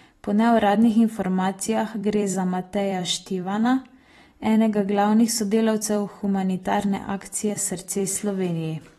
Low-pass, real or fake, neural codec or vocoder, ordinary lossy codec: 19.8 kHz; fake; vocoder, 44.1 kHz, 128 mel bands every 256 samples, BigVGAN v2; AAC, 32 kbps